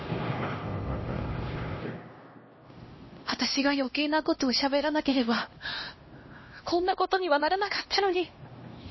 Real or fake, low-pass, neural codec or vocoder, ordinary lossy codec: fake; 7.2 kHz; codec, 16 kHz, 1 kbps, X-Codec, HuBERT features, trained on LibriSpeech; MP3, 24 kbps